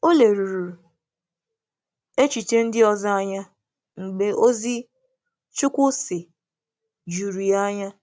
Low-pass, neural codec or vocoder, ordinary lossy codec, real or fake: none; none; none; real